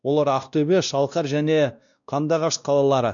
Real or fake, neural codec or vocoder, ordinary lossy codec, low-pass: fake; codec, 16 kHz, 1 kbps, X-Codec, WavLM features, trained on Multilingual LibriSpeech; none; 7.2 kHz